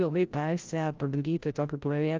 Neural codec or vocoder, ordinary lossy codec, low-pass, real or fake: codec, 16 kHz, 0.5 kbps, FreqCodec, larger model; Opus, 24 kbps; 7.2 kHz; fake